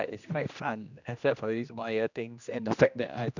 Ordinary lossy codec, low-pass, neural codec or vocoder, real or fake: none; 7.2 kHz; codec, 16 kHz, 1 kbps, X-Codec, HuBERT features, trained on general audio; fake